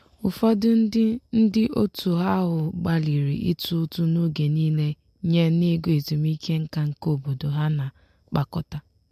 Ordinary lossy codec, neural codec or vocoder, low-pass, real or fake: MP3, 64 kbps; none; 14.4 kHz; real